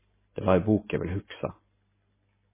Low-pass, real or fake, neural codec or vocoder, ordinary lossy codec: 3.6 kHz; real; none; MP3, 16 kbps